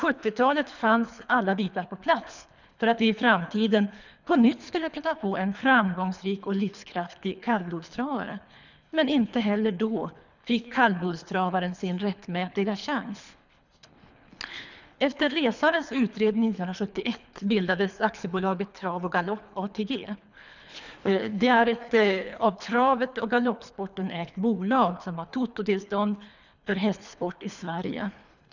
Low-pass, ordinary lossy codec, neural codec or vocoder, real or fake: 7.2 kHz; none; codec, 24 kHz, 3 kbps, HILCodec; fake